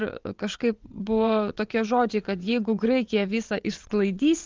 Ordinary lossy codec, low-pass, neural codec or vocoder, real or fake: Opus, 32 kbps; 7.2 kHz; vocoder, 22.05 kHz, 80 mel bands, WaveNeXt; fake